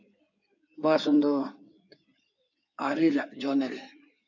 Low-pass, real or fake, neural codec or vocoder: 7.2 kHz; fake; codec, 16 kHz in and 24 kHz out, 2.2 kbps, FireRedTTS-2 codec